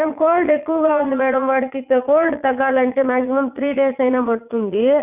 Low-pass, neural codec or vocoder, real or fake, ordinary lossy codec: 3.6 kHz; vocoder, 22.05 kHz, 80 mel bands, Vocos; fake; none